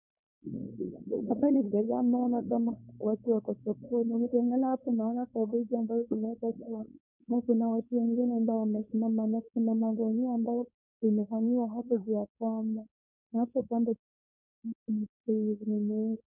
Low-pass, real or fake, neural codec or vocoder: 3.6 kHz; fake; codec, 16 kHz, 4.8 kbps, FACodec